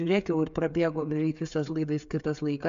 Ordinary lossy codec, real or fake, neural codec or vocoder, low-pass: AAC, 64 kbps; fake; codec, 16 kHz, 16 kbps, FreqCodec, smaller model; 7.2 kHz